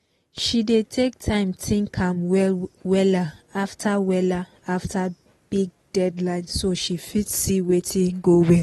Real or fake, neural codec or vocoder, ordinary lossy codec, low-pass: real; none; AAC, 32 kbps; 19.8 kHz